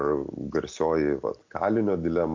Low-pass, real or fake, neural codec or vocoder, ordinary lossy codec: 7.2 kHz; real; none; MP3, 48 kbps